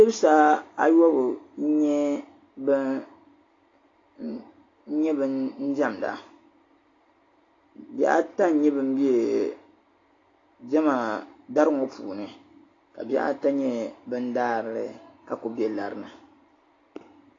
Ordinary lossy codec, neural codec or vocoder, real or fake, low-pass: AAC, 32 kbps; none; real; 7.2 kHz